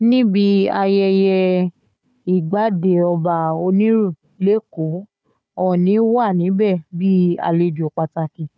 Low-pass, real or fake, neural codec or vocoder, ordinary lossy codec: none; fake; codec, 16 kHz, 4 kbps, FunCodec, trained on Chinese and English, 50 frames a second; none